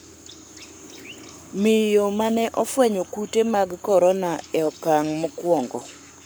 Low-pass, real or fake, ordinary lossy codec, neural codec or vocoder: none; fake; none; codec, 44.1 kHz, 7.8 kbps, Pupu-Codec